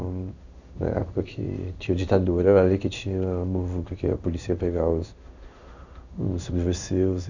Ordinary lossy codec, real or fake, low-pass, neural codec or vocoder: none; fake; 7.2 kHz; codec, 16 kHz in and 24 kHz out, 1 kbps, XY-Tokenizer